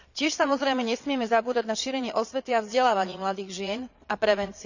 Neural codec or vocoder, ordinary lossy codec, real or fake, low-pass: vocoder, 22.05 kHz, 80 mel bands, Vocos; none; fake; 7.2 kHz